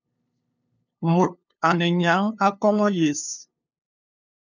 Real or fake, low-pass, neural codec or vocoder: fake; 7.2 kHz; codec, 16 kHz, 2 kbps, FunCodec, trained on LibriTTS, 25 frames a second